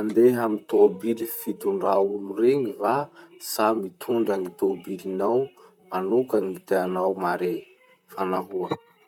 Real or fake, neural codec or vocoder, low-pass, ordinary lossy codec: fake; vocoder, 44.1 kHz, 128 mel bands, Pupu-Vocoder; 19.8 kHz; none